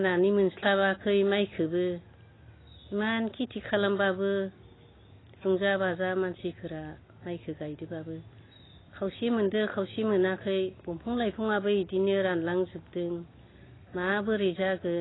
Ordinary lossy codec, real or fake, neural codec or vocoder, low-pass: AAC, 16 kbps; real; none; 7.2 kHz